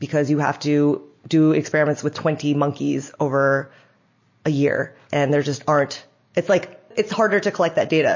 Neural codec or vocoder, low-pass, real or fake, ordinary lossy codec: none; 7.2 kHz; real; MP3, 32 kbps